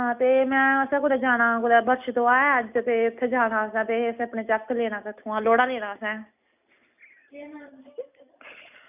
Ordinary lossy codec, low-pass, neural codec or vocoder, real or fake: none; 3.6 kHz; none; real